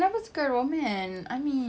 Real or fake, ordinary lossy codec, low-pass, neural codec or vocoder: real; none; none; none